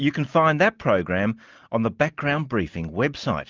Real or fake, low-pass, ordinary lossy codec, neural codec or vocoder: real; 7.2 kHz; Opus, 32 kbps; none